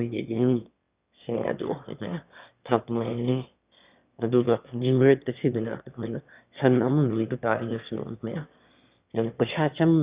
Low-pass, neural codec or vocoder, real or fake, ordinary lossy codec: 3.6 kHz; autoencoder, 22.05 kHz, a latent of 192 numbers a frame, VITS, trained on one speaker; fake; Opus, 64 kbps